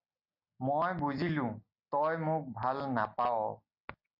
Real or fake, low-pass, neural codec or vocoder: real; 5.4 kHz; none